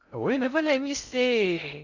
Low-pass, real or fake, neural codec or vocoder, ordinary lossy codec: 7.2 kHz; fake; codec, 16 kHz in and 24 kHz out, 0.6 kbps, FocalCodec, streaming, 2048 codes; none